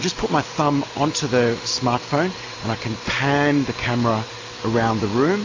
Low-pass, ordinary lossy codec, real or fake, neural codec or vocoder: 7.2 kHz; AAC, 32 kbps; real; none